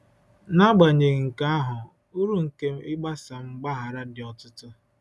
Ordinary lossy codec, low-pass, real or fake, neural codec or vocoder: none; none; real; none